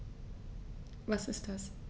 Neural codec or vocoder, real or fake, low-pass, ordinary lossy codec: none; real; none; none